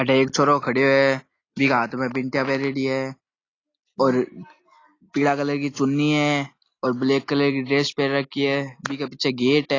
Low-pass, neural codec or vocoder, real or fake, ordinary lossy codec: 7.2 kHz; none; real; AAC, 32 kbps